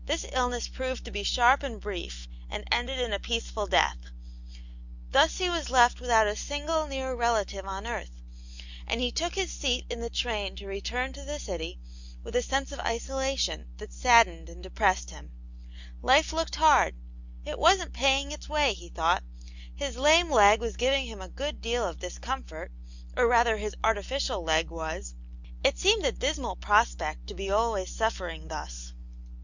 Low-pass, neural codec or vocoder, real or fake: 7.2 kHz; none; real